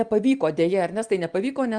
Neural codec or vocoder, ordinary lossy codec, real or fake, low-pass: none; Opus, 32 kbps; real; 9.9 kHz